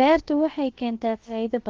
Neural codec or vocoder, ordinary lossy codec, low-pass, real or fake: codec, 16 kHz, about 1 kbps, DyCAST, with the encoder's durations; Opus, 16 kbps; 7.2 kHz; fake